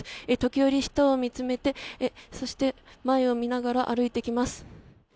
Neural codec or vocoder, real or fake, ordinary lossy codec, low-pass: none; real; none; none